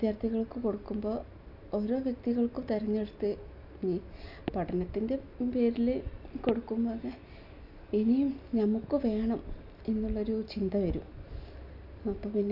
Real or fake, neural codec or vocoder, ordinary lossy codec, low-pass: real; none; none; 5.4 kHz